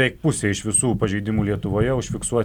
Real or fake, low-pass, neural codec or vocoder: real; 19.8 kHz; none